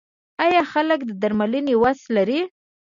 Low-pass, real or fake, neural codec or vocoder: 7.2 kHz; real; none